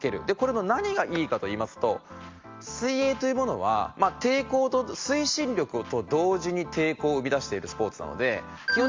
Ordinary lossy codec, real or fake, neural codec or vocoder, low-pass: Opus, 32 kbps; real; none; 7.2 kHz